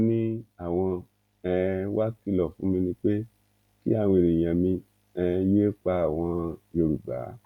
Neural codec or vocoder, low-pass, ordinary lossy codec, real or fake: none; 19.8 kHz; none; real